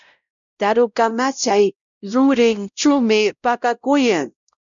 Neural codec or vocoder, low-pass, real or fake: codec, 16 kHz, 0.5 kbps, X-Codec, WavLM features, trained on Multilingual LibriSpeech; 7.2 kHz; fake